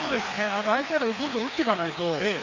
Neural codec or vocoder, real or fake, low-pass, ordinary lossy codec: codec, 16 kHz, 2 kbps, FreqCodec, larger model; fake; 7.2 kHz; MP3, 48 kbps